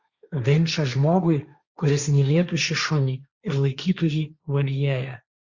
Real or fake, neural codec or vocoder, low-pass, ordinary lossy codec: fake; codec, 16 kHz, 1.1 kbps, Voila-Tokenizer; 7.2 kHz; Opus, 64 kbps